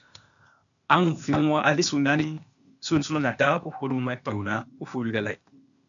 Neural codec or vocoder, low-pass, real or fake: codec, 16 kHz, 0.8 kbps, ZipCodec; 7.2 kHz; fake